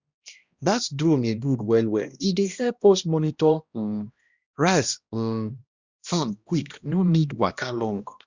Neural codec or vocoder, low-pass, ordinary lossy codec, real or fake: codec, 16 kHz, 1 kbps, X-Codec, HuBERT features, trained on balanced general audio; 7.2 kHz; Opus, 64 kbps; fake